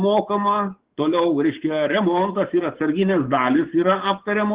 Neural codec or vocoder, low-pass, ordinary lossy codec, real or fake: codec, 16 kHz, 6 kbps, DAC; 3.6 kHz; Opus, 16 kbps; fake